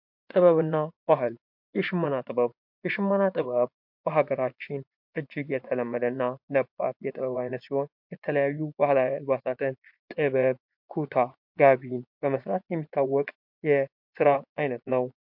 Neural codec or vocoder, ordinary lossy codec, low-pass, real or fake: vocoder, 24 kHz, 100 mel bands, Vocos; MP3, 48 kbps; 5.4 kHz; fake